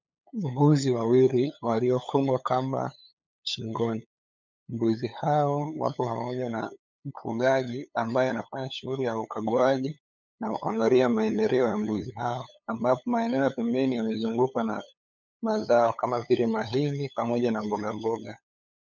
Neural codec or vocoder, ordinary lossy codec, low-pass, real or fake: codec, 16 kHz, 8 kbps, FunCodec, trained on LibriTTS, 25 frames a second; MP3, 64 kbps; 7.2 kHz; fake